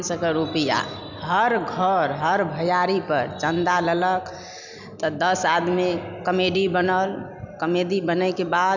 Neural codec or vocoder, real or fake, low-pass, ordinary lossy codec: none; real; 7.2 kHz; none